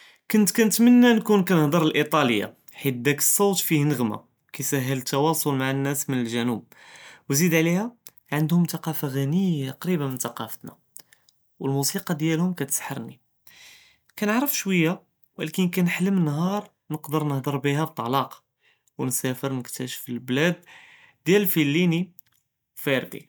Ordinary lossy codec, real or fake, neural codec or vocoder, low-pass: none; real; none; none